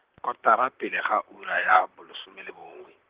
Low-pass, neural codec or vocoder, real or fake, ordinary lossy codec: 3.6 kHz; vocoder, 44.1 kHz, 128 mel bands, Pupu-Vocoder; fake; Opus, 16 kbps